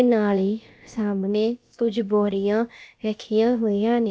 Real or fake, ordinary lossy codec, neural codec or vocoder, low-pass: fake; none; codec, 16 kHz, about 1 kbps, DyCAST, with the encoder's durations; none